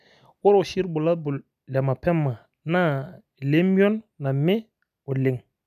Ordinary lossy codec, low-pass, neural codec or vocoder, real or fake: none; 14.4 kHz; none; real